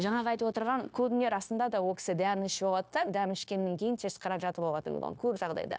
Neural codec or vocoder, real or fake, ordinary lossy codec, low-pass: codec, 16 kHz, 0.9 kbps, LongCat-Audio-Codec; fake; none; none